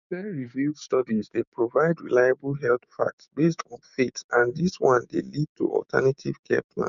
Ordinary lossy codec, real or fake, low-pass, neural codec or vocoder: none; fake; 7.2 kHz; codec, 16 kHz, 6 kbps, DAC